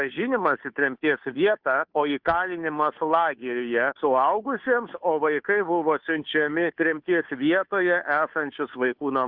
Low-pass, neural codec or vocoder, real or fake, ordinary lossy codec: 5.4 kHz; codec, 16 kHz, 2 kbps, FunCodec, trained on Chinese and English, 25 frames a second; fake; Opus, 64 kbps